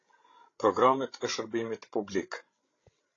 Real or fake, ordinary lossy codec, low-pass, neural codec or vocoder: fake; AAC, 32 kbps; 7.2 kHz; codec, 16 kHz, 16 kbps, FreqCodec, larger model